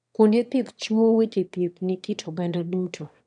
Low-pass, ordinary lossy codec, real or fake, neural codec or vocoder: 9.9 kHz; none; fake; autoencoder, 22.05 kHz, a latent of 192 numbers a frame, VITS, trained on one speaker